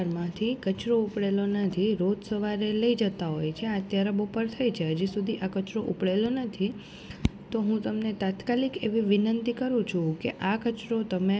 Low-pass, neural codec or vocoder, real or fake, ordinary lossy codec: none; none; real; none